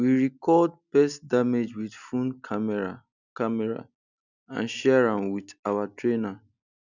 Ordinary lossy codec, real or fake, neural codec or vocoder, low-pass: none; real; none; 7.2 kHz